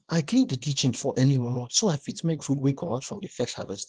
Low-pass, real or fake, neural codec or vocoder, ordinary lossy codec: 9.9 kHz; fake; codec, 24 kHz, 0.9 kbps, WavTokenizer, small release; Opus, 24 kbps